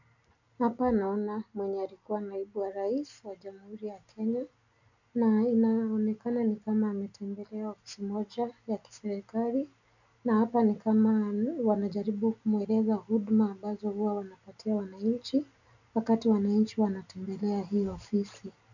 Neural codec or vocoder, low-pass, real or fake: none; 7.2 kHz; real